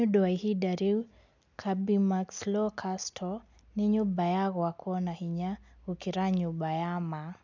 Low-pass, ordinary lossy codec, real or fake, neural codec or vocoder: 7.2 kHz; none; real; none